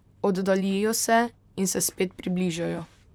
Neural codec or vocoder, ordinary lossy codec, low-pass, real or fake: vocoder, 44.1 kHz, 128 mel bands, Pupu-Vocoder; none; none; fake